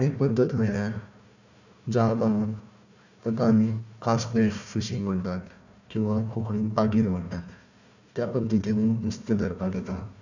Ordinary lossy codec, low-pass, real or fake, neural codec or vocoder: none; 7.2 kHz; fake; codec, 16 kHz, 1 kbps, FunCodec, trained on Chinese and English, 50 frames a second